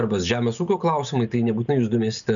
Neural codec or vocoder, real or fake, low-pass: none; real; 7.2 kHz